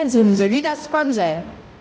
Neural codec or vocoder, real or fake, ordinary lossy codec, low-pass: codec, 16 kHz, 0.5 kbps, X-Codec, HuBERT features, trained on balanced general audio; fake; none; none